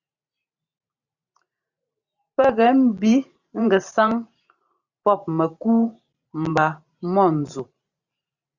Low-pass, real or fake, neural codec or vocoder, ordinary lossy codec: 7.2 kHz; fake; vocoder, 44.1 kHz, 128 mel bands every 512 samples, BigVGAN v2; Opus, 64 kbps